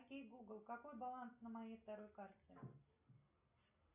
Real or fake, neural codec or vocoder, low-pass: real; none; 3.6 kHz